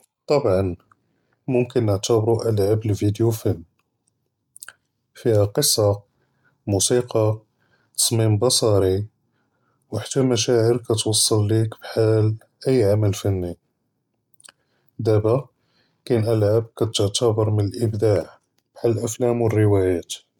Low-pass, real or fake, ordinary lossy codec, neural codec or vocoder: 19.8 kHz; real; MP3, 96 kbps; none